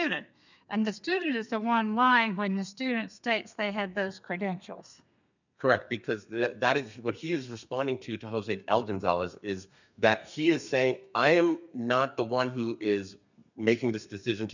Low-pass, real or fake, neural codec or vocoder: 7.2 kHz; fake; codec, 32 kHz, 1.9 kbps, SNAC